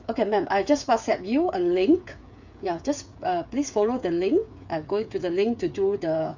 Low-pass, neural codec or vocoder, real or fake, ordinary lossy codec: 7.2 kHz; codec, 16 kHz, 8 kbps, FreqCodec, smaller model; fake; none